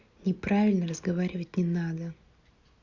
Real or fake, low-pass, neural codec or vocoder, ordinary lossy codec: real; 7.2 kHz; none; Opus, 64 kbps